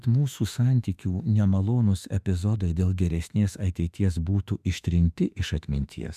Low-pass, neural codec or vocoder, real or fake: 14.4 kHz; autoencoder, 48 kHz, 32 numbers a frame, DAC-VAE, trained on Japanese speech; fake